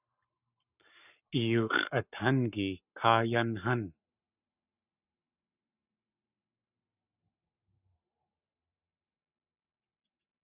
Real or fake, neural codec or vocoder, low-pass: fake; codec, 44.1 kHz, 7.8 kbps, Pupu-Codec; 3.6 kHz